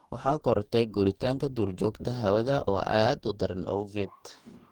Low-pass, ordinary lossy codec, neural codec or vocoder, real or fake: 19.8 kHz; Opus, 24 kbps; codec, 44.1 kHz, 2.6 kbps, DAC; fake